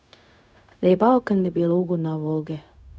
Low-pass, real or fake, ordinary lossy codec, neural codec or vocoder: none; fake; none; codec, 16 kHz, 0.4 kbps, LongCat-Audio-Codec